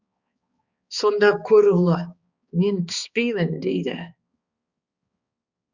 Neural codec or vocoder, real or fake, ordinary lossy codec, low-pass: codec, 16 kHz, 2 kbps, X-Codec, HuBERT features, trained on balanced general audio; fake; Opus, 64 kbps; 7.2 kHz